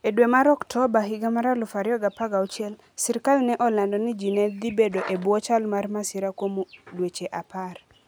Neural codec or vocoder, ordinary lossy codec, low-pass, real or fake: none; none; none; real